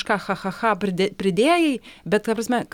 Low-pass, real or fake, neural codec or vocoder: 19.8 kHz; real; none